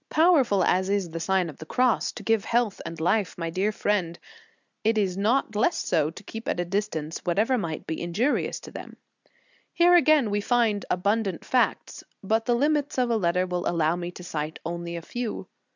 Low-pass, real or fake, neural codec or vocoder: 7.2 kHz; real; none